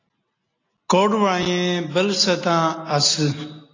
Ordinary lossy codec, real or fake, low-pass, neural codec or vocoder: AAC, 32 kbps; real; 7.2 kHz; none